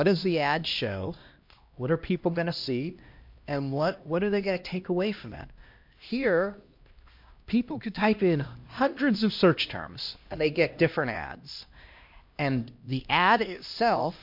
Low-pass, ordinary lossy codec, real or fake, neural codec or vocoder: 5.4 kHz; MP3, 48 kbps; fake; codec, 16 kHz, 1 kbps, X-Codec, HuBERT features, trained on LibriSpeech